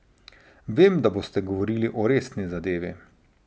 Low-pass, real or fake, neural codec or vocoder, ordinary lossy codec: none; real; none; none